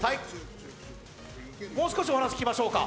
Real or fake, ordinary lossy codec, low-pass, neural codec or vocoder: real; none; none; none